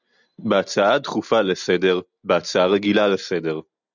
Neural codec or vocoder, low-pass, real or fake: none; 7.2 kHz; real